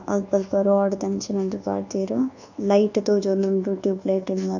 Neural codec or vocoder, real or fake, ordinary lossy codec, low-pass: codec, 24 kHz, 1.2 kbps, DualCodec; fake; none; 7.2 kHz